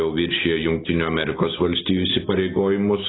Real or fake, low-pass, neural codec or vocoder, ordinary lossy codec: real; 7.2 kHz; none; AAC, 16 kbps